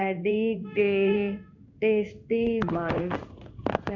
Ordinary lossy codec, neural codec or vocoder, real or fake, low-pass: AAC, 48 kbps; codec, 16 kHz in and 24 kHz out, 1 kbps, XY-Tokenizer; fake; 7.2 kHz